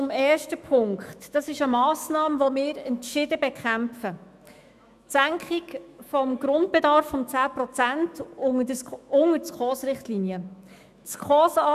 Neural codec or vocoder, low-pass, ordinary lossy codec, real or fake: autoencoder, 48 kHz, 128 numbers a frame, DAC-VAE, trained on Japanese speech; 14.4 kHz; none; fake